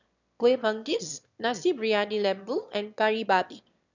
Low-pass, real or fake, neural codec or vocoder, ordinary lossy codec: 7.2 kHz; fake; autoencoder, 22.05 kHz, a latent of 192 numbers a frame, VITS, trained on one speaker; none